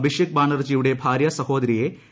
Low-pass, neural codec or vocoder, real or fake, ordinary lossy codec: none; none; real; none